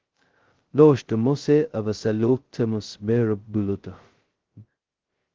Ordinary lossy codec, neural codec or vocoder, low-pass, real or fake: Opus, 16 kbps; codec, 16 kHz, 0.2 kbps, FocalCodec; 7.2 kHz; fake